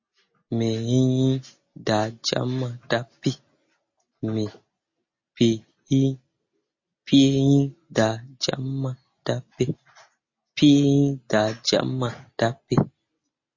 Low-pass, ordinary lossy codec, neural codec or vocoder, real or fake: 7.2 kHz; MP3, 32 kbps; none; real